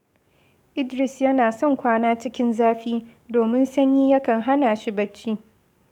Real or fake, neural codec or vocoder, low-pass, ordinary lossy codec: fake; codec, 44.1 kHz, 7.8 kbps, DAC; 19.8 kHz; none